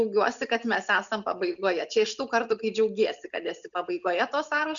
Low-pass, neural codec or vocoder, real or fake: 7.2 kHz; none; real